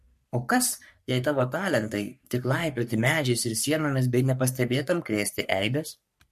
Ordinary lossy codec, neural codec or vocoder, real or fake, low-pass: MP3, 64 kbps; codec, 44.1 kHz, 3.4 kbps, Pupu-Codec; fake; 14.4 kHz